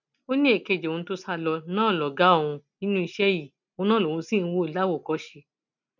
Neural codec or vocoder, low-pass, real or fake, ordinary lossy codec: none; 7.2 kHz; real; none